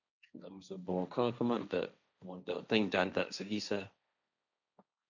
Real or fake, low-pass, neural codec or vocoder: fake; 7.2 kHz; codec, 16 kHz, 1.1 kbps, Voila-Tokenizer